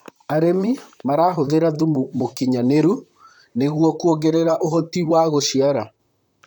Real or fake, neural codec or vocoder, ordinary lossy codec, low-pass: fake; vocoder, 44.1 kHz, 128 mel bands, Pupu-Vocoder; none; 19.8 kHz